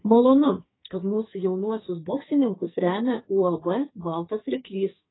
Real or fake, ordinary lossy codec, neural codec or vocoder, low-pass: fake; AAC, 16 kbps; codec, 44.1 kHz, 2.6 kbps, SNAC; 7.2 kHz